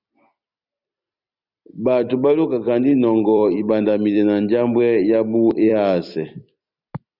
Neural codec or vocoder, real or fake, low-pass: vocoder, 44.1 kHz, 128 mel bands every 256 samples, BigVGAN v2; fake; 5.4 kHz